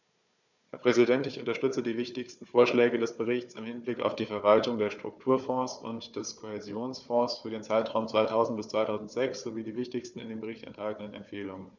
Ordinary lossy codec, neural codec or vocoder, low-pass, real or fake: none; codec, 16 kHz, 4 kbps, FunCodec, trained on Chinese and English, 50 frames a second; 7.2 kHz; fake